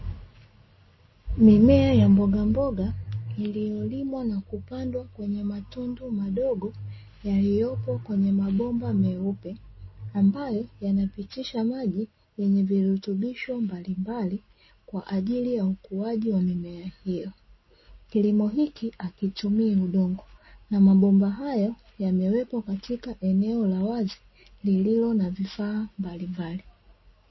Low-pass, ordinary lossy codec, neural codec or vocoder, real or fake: 7.2 kHz; MP3, 24 kbps; none; real